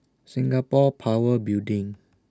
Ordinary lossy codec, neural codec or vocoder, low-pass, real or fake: none; none; none; real